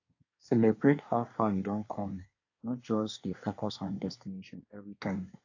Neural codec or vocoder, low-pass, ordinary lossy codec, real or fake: codec, 24 kHz, 1 kbps, SNAC; 7.2 kHz; AAC, 48 kbps; fake